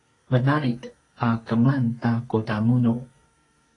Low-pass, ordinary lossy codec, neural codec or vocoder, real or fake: 10.8 kHz; AAC, 32 kbps; codec, 32 kHz, 1.9 kbps, SNAC; fake